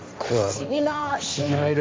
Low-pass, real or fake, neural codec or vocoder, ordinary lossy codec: none; fake; codec, 16 kHz, 1.1 kbps, Voila-Tokenizer; none